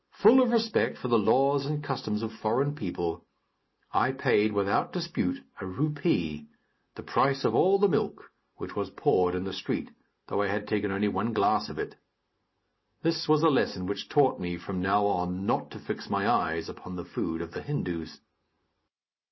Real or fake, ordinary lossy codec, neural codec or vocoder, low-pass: real; MP3, 24 kbps; none; 7.2 kHz